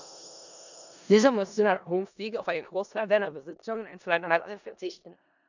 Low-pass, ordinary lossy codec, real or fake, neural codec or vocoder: 7.2 kHz; none; fake; codec, 16 kHz in and 24 kHz out, 0.4 kbps, LongCat-Audio-Codec, four codebook decoder